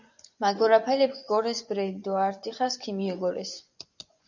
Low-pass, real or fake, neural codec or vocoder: 7.2 kHz; fake; vocoder, 24 kHz, 100 mel bands, Vocos